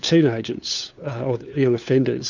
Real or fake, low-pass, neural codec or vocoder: fake; 7.2 kHz; codec, 16 kHz, 4 kbps, FunCodec, trained on LibriTTS, 50 frames a second